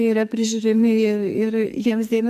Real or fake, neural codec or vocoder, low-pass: fake; codec, 44.1 kHz, 2.6 kbps, SNAC; 14.4 kHz